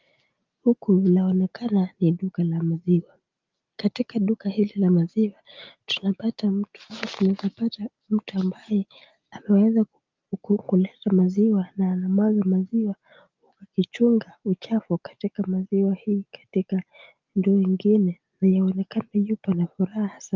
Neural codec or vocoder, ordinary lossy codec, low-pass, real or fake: none; Opus, 32 kbps; 7.2 kHz; real